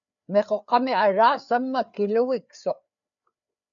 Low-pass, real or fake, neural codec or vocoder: 7.2 kHz; fake; codec, 16 kHz, 4 kbps, FreqCodec, larger model